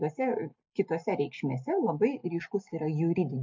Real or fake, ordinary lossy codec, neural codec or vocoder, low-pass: real; MP3, 64 kbps; none; 7.2 kHz